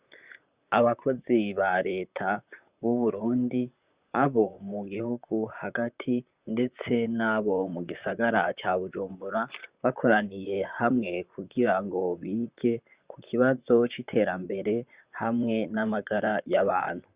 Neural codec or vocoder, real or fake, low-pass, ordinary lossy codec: vocoder, 44.1 kHz, 128 mel bands, Pupu-Vocoder; fake; 3.6 kHz; Opus, 64 kbps